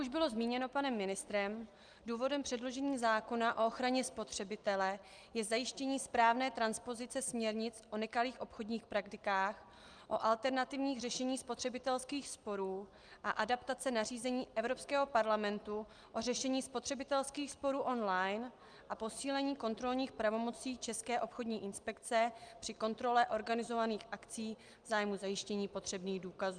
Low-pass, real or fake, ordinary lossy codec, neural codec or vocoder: 9.9 kHz; real; Opus, 32 kbps; none